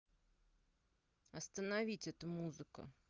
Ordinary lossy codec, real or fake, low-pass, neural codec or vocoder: Opus, 32 kbps; real; 7.2 kHz; none